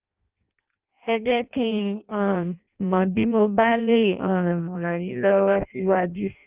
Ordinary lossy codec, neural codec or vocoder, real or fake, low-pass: Opus, 24 kbps; codec, 16 kHz in and 24 kHz out, 0.6 kbps, FireRedTTS-2 codec; fake; 3.6 kHz